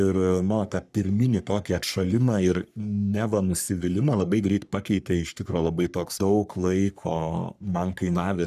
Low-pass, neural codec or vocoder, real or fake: 14.4 kHz; codec, 44.1 kHz, 3.4 kbps, Pupu-Codec; fake